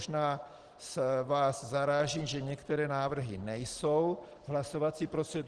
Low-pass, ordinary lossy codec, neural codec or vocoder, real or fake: 9.9 kHz; Opus, 16 kbps; none; real